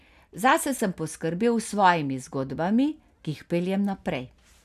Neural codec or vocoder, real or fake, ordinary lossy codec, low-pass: none; real; none; 14.4 kHz